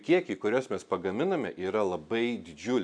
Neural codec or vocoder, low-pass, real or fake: none; 9.9 kHz; real